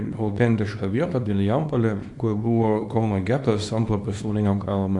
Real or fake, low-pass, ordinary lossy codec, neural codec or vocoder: fake; 10.8 kHz; AAC, 64 kbps; codec, 24 kHz, 0.9 kbps, WavTokenizer, small release